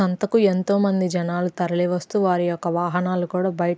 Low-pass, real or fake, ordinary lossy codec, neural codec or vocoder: none; real; none; none